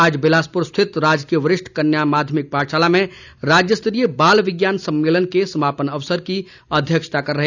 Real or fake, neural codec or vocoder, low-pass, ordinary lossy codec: real; none; 7.2 kHz; none